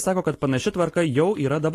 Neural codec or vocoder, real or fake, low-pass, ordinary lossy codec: none; real; 14.4 kHz; AAC, 48 kbps